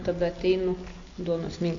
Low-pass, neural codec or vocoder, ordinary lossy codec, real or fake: 7.2 kHz; none; MP3, 48 kbps; real